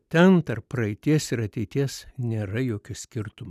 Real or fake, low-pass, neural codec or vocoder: real; 14.4 kHz; none